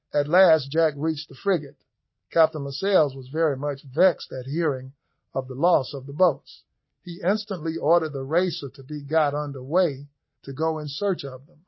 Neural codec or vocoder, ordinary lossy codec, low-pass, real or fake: none; MP3, 24 kbps; 7.2 kHz; real